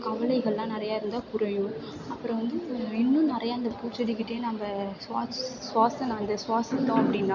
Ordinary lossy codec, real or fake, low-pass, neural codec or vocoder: none; real; 7.2 kHz; none